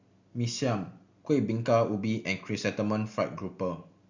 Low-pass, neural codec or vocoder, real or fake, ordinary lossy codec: 7.2 kHz; none; real; Opus, 64 kbps